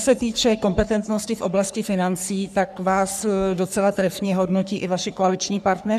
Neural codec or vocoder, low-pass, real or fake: codec, 44.1 kHz, 3.4 kbps, Pupu-Codec; 14.4 kHz; fake